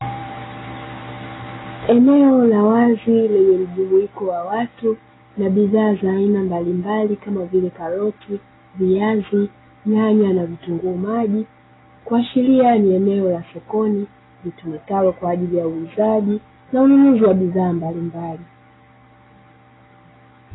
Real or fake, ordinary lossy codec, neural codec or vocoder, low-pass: real; AAC, 16 kbps; none; 7.2 kHz